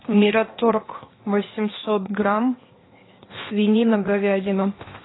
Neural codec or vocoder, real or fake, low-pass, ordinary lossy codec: codec, 16 kHz, 0.8 kbps, ZipCodec; fake; 7.2 kHz; AAC, 16 kbps